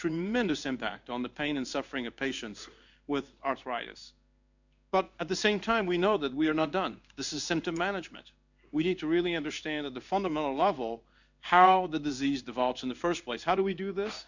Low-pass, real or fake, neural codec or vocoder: 7.2 kHz; fake; codec, 16 kHz in and 24 kHz out, 1 kbps, XY-Tokenizer